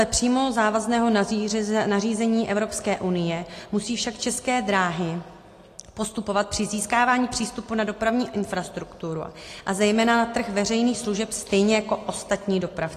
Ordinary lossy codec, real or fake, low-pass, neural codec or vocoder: AAC, 48 kbps; real; 14.4 kHz; none